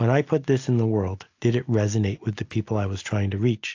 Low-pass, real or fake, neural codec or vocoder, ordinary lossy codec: 7.2 kHz; real; none; AAC, 48 kbps